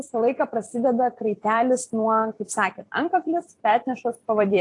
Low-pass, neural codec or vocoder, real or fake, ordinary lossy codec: 10.8 kHz; vocoder, 44.1 kHz, 128 mel bands every 256 samples, BigVGAN v2; fake; AAC, 48 kbps